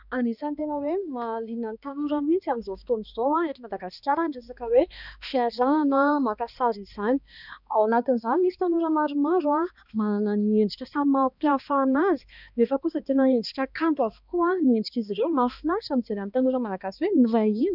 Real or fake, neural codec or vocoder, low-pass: fake; codec, 16 kHz, 2 kbps, X-Codec, HuBERT features, trained on balanced general audio; 5.4 kHz